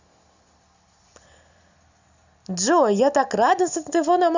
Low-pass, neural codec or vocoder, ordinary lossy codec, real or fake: 7.2 kHz; none; Opus, 64 kbps; real